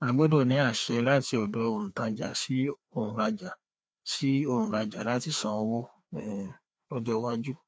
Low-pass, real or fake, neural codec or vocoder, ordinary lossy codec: none; fake; codec, 16 kHz, 2 kbps, FreqCodec, larger model; none